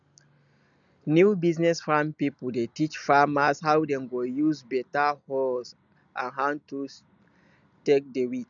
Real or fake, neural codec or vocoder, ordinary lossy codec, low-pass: real; none; none; 7.2 kHz